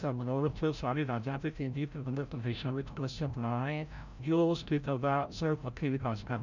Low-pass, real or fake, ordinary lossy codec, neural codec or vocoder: 7.2 kHz; fake; none; codec, 16 kHz, 0.5 kbps, FreqCodec, larger model